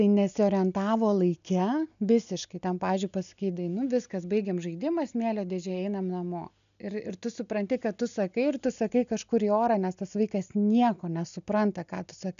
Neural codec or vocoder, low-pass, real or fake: none; 7.2 kHz; real